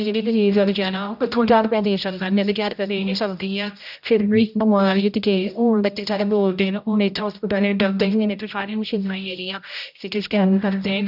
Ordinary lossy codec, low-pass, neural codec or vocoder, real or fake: none; 5.4 kHz; codec, 16 kHz, 0.5 kbps, X-Codec, HuBERT features, trained on general audio; fake